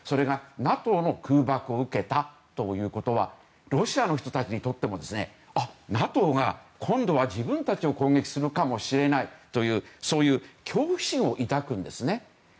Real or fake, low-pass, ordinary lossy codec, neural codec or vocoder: real; none; none; none